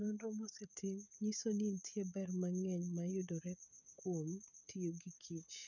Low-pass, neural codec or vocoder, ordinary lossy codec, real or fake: 7.2 kHz; codec, 16 kHz, 16 kbps, FreqCodec, smaller model; none; fake